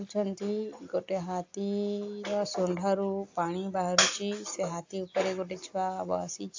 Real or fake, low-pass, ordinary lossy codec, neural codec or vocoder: real; 7.2 kHz; AAC, 48 kbps; none